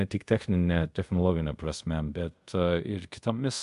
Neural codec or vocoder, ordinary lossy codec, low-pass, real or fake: codec, 24 kHz, 0.5 kbps, DualCodec; MP3, 64 kbps; 10.8 kHz; fake